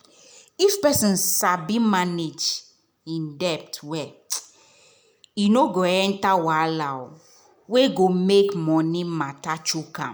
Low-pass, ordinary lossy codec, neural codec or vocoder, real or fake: none; none; none; real